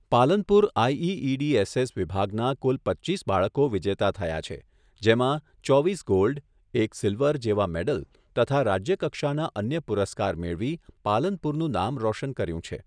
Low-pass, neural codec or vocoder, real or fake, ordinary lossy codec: 9.9 kHz; none; real; none